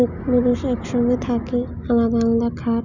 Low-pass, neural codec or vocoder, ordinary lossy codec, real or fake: 7.2 kHz; none; none; real